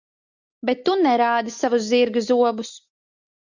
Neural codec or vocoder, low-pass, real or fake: none; 7.2 kHz; real